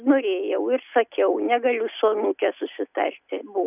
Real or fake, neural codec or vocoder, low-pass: real; none; 3.6 kHz